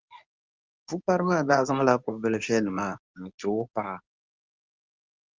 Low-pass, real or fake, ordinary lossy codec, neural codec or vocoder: 7.2 kHz; fake; Opus, 32 kbps; codec, 24 kHz, 0.9 kbps, WavTokenizer, medium speech release version 2